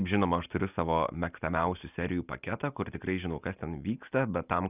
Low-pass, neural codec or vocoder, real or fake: 3.6 kHz; none; real